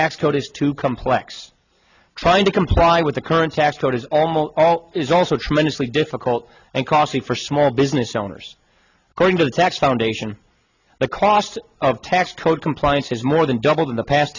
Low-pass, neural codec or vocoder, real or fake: 7.2 kHz; none; real